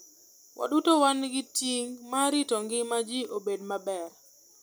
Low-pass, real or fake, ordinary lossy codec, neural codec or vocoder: none; real; none; none